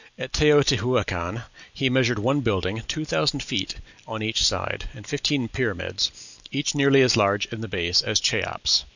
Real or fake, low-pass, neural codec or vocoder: real; 7.2 kHz; none